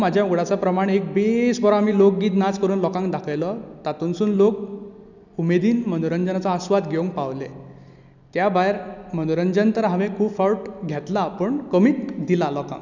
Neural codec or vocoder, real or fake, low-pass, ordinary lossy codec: none; real; 7.2 kHz; none